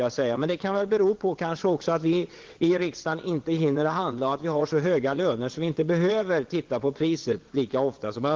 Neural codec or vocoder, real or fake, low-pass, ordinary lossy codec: vocoder, 22.05 kHz, 80 mel bands, WaveNeXt; fake; 7.2 kHz; Opus, 16 kbps